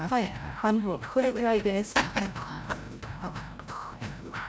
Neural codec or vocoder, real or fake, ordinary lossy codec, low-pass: codec, 16 kHz, 0.5 kbps, FreqCodec, larger model; fake; none; none